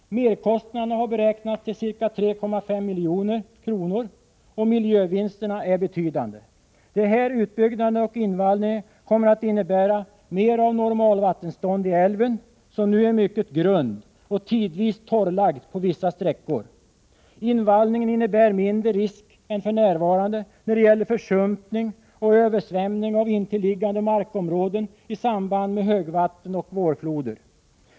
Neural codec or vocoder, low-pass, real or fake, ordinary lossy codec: none; none; real; none